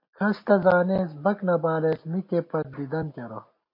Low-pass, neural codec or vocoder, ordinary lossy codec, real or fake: 5.4 kHz; none; MP3, 32 kbps; real